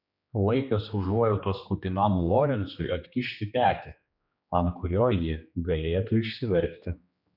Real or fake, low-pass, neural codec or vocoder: fake; 5.4 kHz; codec, 16 kHz, 2 kbps, X-Codec, HuBERT features, trained on general audio